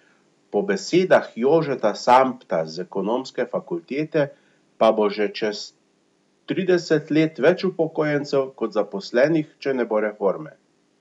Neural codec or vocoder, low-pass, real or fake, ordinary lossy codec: none; 10.8 kHz; real; none